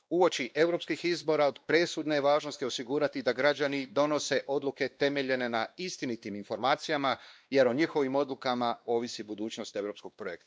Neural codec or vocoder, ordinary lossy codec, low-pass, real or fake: codec, 16 kHz, 2 kbps, X-Codec, WavLM features, trained on Multilingual LibriSpeech; none; none; fake